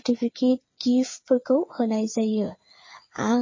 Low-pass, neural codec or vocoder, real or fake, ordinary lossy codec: 7.2 kHz; codec, 16 kHz, 8 kbps, FreqCodec, smaller model; fake; MP3, 32 kbps